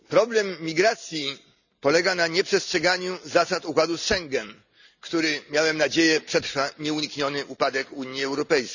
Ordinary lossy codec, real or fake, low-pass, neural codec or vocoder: none; real; 7.2 kHz; none